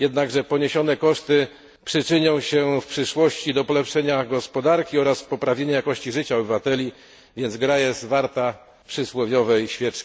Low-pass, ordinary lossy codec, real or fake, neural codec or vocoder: none; none; real; none